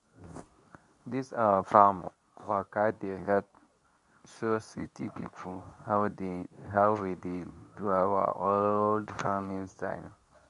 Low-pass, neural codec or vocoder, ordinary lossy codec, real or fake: 10.8 kHz; codec, 24 kHz, 0.9 kbps, WavTokenizer, medium speech release version 2; none; fake